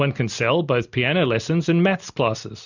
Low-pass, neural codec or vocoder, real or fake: 7.2 kHz; none; real